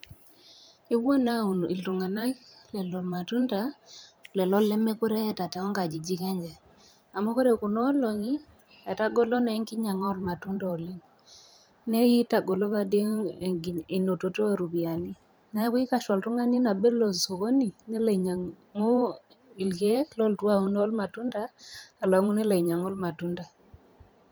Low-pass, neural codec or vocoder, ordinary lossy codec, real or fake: none; vocoder, 44.1 kHz, 128 mel bands every 512 samples, BigVGAN v2; none; fake